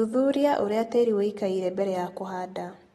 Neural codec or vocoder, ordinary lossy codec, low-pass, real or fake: none; AAC, 32 kbps; 14.4 kHz; real